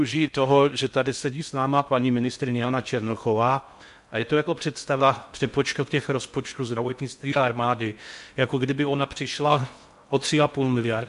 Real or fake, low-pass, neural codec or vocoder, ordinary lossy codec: fake; 10.8 kHz; codec, 16 kHz in and 24 kHz out, 0.6 kbps, FocalCodec, streaming, 2048 codes; MP3, 64 kbps